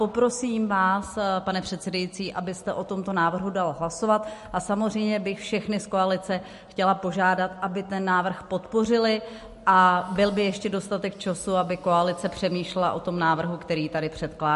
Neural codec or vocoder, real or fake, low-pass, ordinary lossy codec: none; real; 14.4 kHz; MP3, 48 kbps